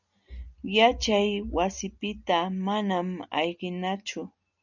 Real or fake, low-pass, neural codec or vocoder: real; 7.2 kHz; none